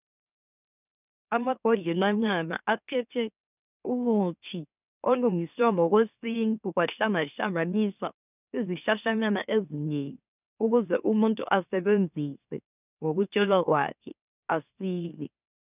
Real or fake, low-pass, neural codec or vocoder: fake; 3.6 kHz; autoencoder, 44.1 kHz, a latent of 192 numbers a frame, MeloTTS